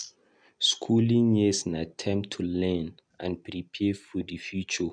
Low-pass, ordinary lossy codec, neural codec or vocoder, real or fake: 9.9 kHz; MP3, 96 kbps; none; real